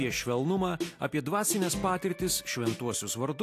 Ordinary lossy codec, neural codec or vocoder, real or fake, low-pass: AAC, 64 kbps; none; real; 14.4 kHz